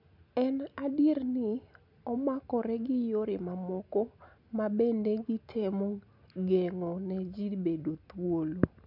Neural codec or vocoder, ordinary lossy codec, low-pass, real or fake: none; none; 5.4 kHz; real